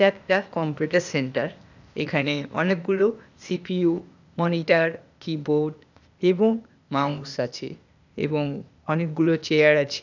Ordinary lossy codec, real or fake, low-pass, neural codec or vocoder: none; fake; 7.2 kHz; codec, 16 kHz, 0.8 kbps, ZipCodec